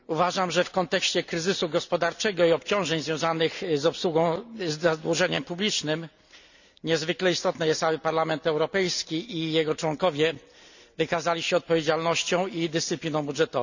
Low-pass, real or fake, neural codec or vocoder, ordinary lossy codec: 7.2 kHz; real; none; none